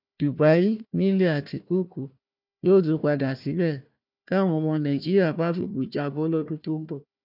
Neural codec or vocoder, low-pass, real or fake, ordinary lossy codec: codec, 16 kHz, 1 kbps, FunCodec, trained on Chinese and English, 50 frames a second; 5.4 kHz; fake; none